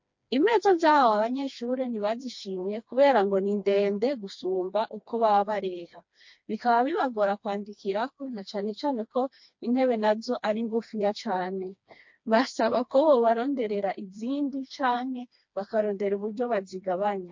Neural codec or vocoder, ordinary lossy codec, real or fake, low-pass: codec, 16 kHz, 2 kbps, FreqCodec, smaller model; MP3, 48 kbps; fake; 7.2 kHz